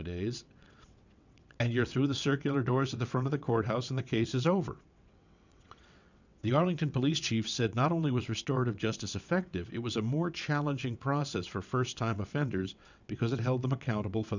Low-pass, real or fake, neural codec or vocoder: 7.2 kHz; fake; vocoder, 22.05 kHz, 80 mel bands, WaveNeXt